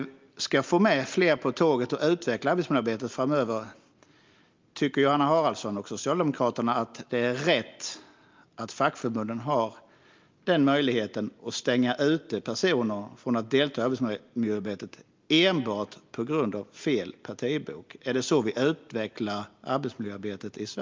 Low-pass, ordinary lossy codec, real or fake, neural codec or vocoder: 7.2 kHz; Opus, 24 kbps; real; none